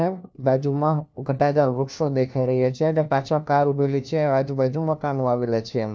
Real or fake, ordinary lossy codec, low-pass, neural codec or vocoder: fake; none; none; codec, 16 kHz, 1 kbps, FunCodec, trained on LibriTTS, 50 frames a second